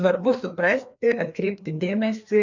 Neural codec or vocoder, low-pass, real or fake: codec, 16 kHz, 2 kbps, FreqCodec, larger model; 7.2 kHz; fake